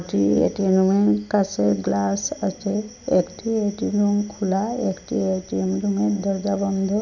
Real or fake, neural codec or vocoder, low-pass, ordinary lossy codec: real; none; 7.2 kHz; none